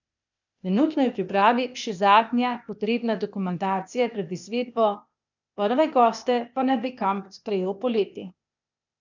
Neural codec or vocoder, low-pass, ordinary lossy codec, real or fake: codec, 16 kHz, 0.8 kbps, ZipCodec; 7.2 kHz; none; fake